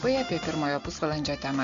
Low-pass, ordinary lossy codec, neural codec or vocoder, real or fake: 7.2 kHz; Opus, 64 kbps; none; real